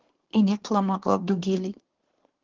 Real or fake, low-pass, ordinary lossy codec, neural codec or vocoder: fake; 7.2 kHz; Opus, 16 kbps; codec, 24 kHz, 1 kbps, SNAC